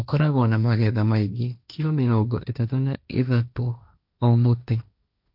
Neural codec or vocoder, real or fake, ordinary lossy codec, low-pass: codec, 16 kHz, 1.1 kbps, Voila-Tokenizer; fake; none; 5.4 kHz